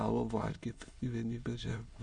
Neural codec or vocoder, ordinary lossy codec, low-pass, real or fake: autoencoder, 22.05 kHz, a latent of 192 numbers a frame, VITS, trained on many speakers; Opus, 64 kbps; 9.9 kHz; fake